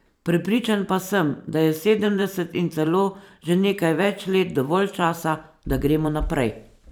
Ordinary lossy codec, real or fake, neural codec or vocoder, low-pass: none; fake; vocoder, 44.1 kHz, 128 mel bands every 512 samples, BigVGAN v2; none